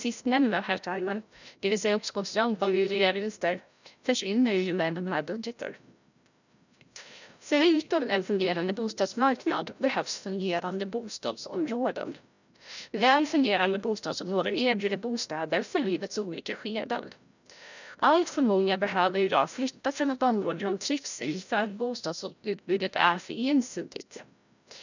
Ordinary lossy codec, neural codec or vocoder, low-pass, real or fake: none; codec, 16 kHz, 0.5 kbps, FreqCodec, larger model; 7.2 kHz; fake